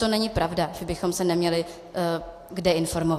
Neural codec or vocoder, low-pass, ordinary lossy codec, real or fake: none; 14.4 kHz; AAC, 64 kbps; real